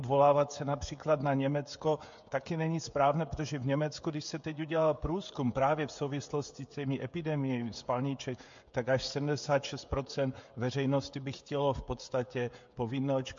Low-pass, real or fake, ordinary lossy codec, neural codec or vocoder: 7.2 kHz; fake; MP3, 48 kbps; codec, 16 kHz, 16 kbps, FreqCodec, smaller model